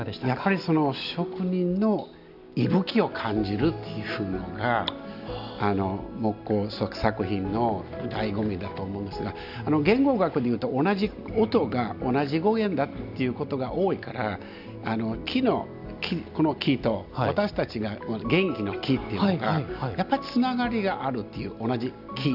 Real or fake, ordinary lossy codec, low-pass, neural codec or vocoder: real; none; 5.4 kHz; none